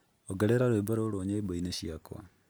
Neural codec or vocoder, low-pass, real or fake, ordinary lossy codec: none; none; real; none